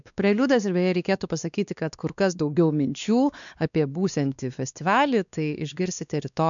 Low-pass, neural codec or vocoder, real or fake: 7.2 kHz; codec, 16 kHz, 2 kbps, X-Codec, WavLM features, trained on Multilingual LibriSpeech; fake